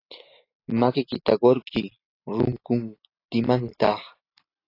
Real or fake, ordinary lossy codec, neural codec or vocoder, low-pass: real; AAC, 32 kbps; none; 5.4 kHz